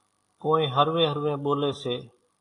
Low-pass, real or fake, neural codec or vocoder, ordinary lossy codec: 10.8 kHz; real; none; AAC, 48 kbps